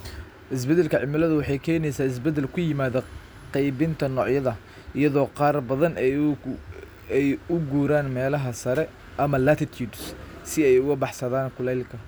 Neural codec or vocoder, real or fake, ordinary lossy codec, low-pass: none; real; none; none